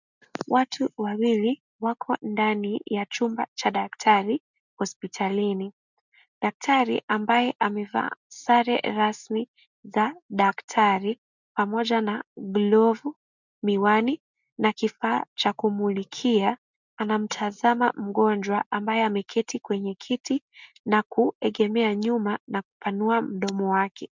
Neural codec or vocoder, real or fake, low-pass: none; real; 7.2 kHz